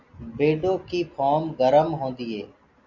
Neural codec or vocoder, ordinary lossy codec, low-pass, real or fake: none; Opus, 64 kbps; 7.2 kHz; real